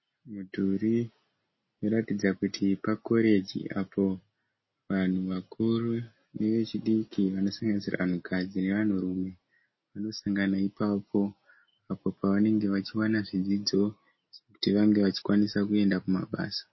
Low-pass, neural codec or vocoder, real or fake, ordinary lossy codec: 7.2 kHz; none; real; MP3, 24 kbps